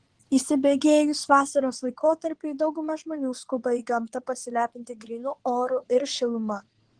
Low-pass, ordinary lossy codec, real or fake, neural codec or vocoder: 9.9 kHz; Opus, 16 kbps; fake; codec, 16 kHz in and 24 kHz out, 2.2 kbps, FireRedTTS-2 codec